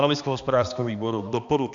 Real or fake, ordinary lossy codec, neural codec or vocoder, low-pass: fake; AAC, 64 kbps; codec, 16 kHz, 2 kbps, X-Codec, HuBERT features, trained on balanced general audio; 7.2 kHz